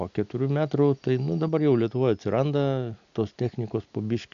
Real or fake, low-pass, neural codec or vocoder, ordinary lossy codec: real; 7.2 kHz; none; AAC, 96 kbps